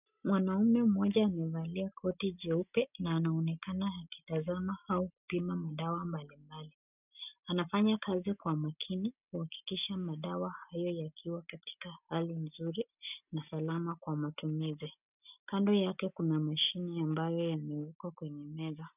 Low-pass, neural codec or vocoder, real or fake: 3.6 kHz; none; real